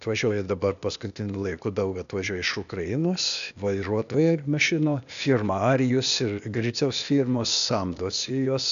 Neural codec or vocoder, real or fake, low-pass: codec, 16 kHz, 0.8 kbps, ZipCodec; fake; 7.2 kHz